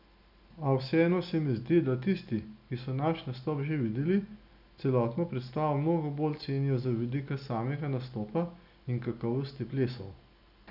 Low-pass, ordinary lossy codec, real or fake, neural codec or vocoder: 5.4 kHz; none; real; none